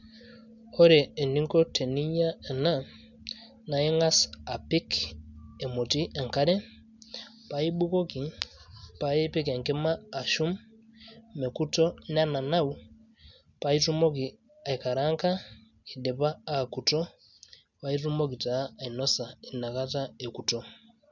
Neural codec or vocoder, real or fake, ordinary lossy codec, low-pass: none; real; none; 7.2 kHz